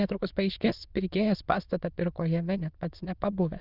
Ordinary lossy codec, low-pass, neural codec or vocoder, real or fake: Opus, 24 kbps; 5.4 kHz; autoencoder, 22.05 kHz, a latent of 192 numbers a frame, VITS, trained on many speakers; fake